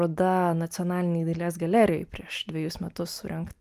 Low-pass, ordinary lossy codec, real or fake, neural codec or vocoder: 14.4 kHz; Opus, 32 kbps; real; none